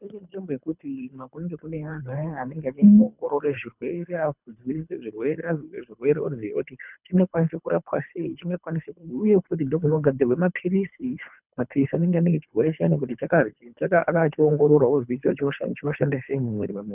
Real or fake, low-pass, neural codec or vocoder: fake; 3.6 kHz; codec, 24 kHz, 3 kbps, HILCodec